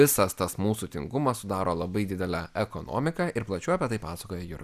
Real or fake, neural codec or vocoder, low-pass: real; none; 14.4 kHz